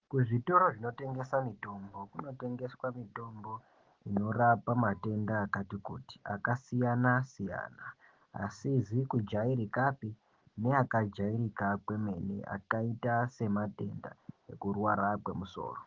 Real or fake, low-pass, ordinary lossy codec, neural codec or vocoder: real; 7.2 kHz; Opus, 16 kbps; none